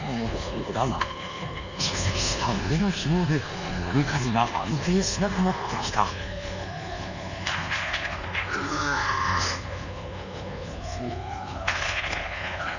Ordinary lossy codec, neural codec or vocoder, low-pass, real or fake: none; codec, 24 kHz, 1.2 kbps, DualCodec; 7.2 kHz; fake